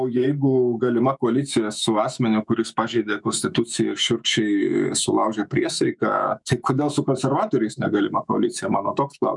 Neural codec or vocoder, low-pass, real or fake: autoencoder, 48 kHz, 128 numbers a frame, DAC-VAE, trained on Japanese speech; 10.8 kHz; fake